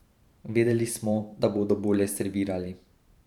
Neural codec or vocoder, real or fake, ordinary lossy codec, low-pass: vocoder, 44.1 kHz, 128 mel bands every 512 samples, BigVGAN v2; fake; none; 19.8 kHz